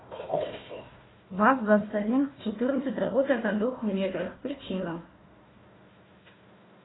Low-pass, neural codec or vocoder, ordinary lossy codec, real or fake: 7.2 kHz; codec, 16 kHz, 1 kbps, FunCodec, trained on Chinese and English, 50 frames a second; AAC, 16 kbps; fake